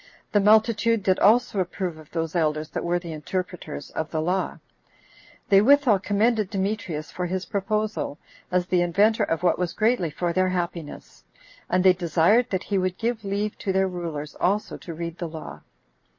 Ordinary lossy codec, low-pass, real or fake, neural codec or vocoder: MP3, 32 kbps; 7.2 kHz; real; none